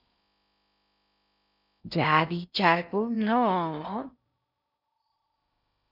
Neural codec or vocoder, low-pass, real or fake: codec, 16 kHz in and 24 kHz out, 0.6 kbps, FocalCodec, streaming, 4096 codes; 5.4 kHz; fake